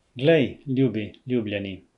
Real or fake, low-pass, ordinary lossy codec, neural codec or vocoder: real; 10.8 kHz; none; none